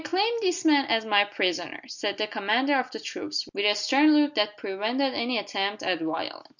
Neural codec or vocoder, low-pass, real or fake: none; 7.2 kHz; real